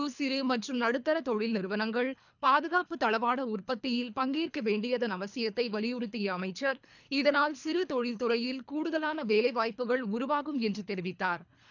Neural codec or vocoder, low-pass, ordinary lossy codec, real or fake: codec, 24 kHz, 3 kbps, HILCodec; 7.2 kHz; none; fake